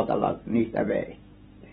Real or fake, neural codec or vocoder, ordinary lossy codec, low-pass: real; none; AAC, 16 kbps; 19.8 kHz